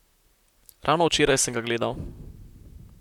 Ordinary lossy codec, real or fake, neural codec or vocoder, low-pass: none; fake; vocoder, 44.1 kHz, 128 mel bands, Pupu-Vocoder; 19.8 kHz